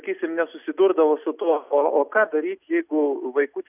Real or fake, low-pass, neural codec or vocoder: real; 3.6 kHz; none